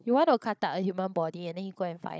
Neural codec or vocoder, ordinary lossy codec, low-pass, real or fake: codec, 16 kHz, 16 kbps, FunCodec, trained on Chinese and English, 50 frames a second; none; none; fake